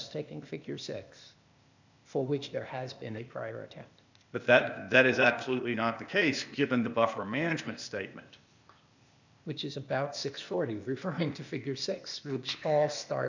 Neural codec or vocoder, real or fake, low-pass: codec, 16 kHz, 0.8 kbps, ZipCodec; fake; 7.2 kHz